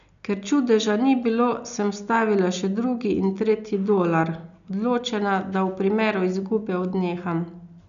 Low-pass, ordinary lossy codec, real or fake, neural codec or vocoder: 7.2 kHz; none; real; none